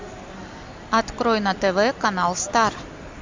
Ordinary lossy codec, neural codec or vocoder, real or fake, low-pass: MP3, 64 kbps; none; real; 7.2 kHz